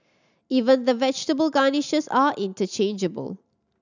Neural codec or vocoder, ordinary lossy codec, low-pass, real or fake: none; none; 7.2 kHz; real